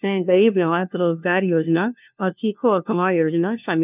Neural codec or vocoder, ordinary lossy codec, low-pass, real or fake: codec, 16 kHz, 1 kbps, FunCodec, trained on LibriTTS, 50 frames a second; none; 3.6 kHz; fake